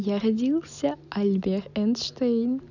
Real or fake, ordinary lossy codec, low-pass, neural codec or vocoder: real; none; 7.2 kHz; none